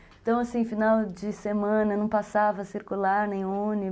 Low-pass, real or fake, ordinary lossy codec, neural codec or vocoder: none; real; none; none